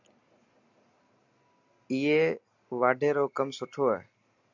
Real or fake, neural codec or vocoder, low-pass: real; none; 7.2 kHz